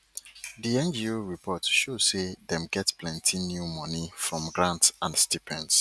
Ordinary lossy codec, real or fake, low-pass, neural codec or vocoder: none; real; none; none